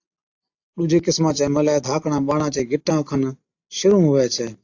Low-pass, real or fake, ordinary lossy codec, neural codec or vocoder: 7.2 kHz; real; AAC, 48 kbps; none